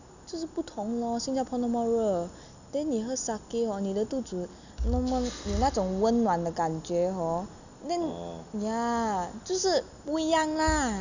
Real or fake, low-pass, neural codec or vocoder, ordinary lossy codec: real; 7.2 kHz; none; none